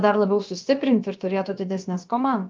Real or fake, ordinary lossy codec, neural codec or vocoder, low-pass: fake; Opus, 32 kbps; codec, 16 kHz, about 1 kbps, DyCAST, with the encoder's durations; 7.2 kHz